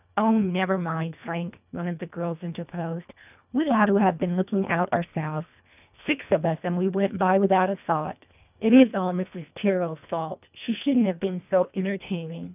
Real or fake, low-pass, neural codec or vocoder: fake; 3.6 kHz; codec, 24 kHz, 1.5 kbps, HILCodec